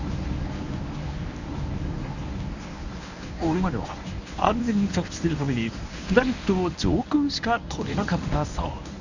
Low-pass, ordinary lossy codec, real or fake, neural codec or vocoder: 7.2 kHz; none; fake; codec, 24 kHz, 0.9 kbps, WavTokenizer, medium speech release version 1